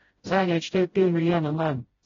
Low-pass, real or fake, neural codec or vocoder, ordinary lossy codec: 7.2 kHz; fake; codec, 16 kHz, 0.5 kbps, FreqCodec, smaller model; AAC, 24 kbps